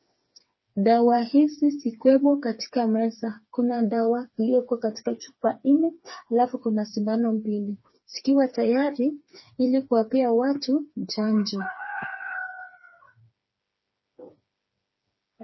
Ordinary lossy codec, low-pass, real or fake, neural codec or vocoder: MP3, 24 kbps; 7.2 kHz; fake; codec, 16 kHz, 4 kbps, FreqCodec, smaller model